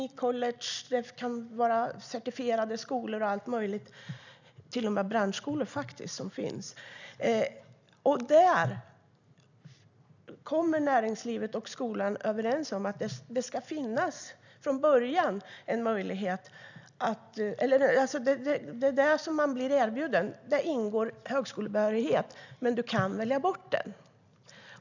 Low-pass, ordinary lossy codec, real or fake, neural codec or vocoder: 7.2 kHz; none; real; none